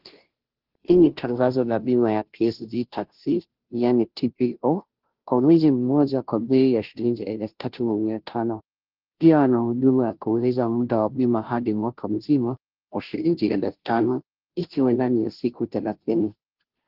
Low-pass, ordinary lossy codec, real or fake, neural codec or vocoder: 5.4 kHz; Opus, 16 kbps; fake; codec, 16 kHz, 0.5 kbps, FunCodec, trained on Chinese and English, 25 frames a second